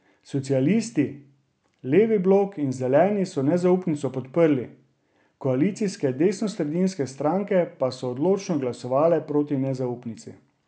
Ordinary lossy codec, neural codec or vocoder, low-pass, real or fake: none; none; none; real